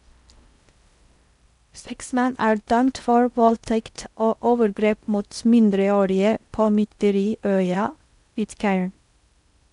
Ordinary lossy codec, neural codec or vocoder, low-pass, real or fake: MP3, 96 kbps; codec, 16 kHz in and 24 kHz out, 0.6 kbps, FocalCodec, streaming, 4096 codes; 10.8 kHz; fake